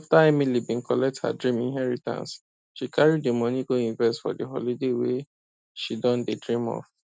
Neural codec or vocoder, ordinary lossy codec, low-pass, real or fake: none; none; none; real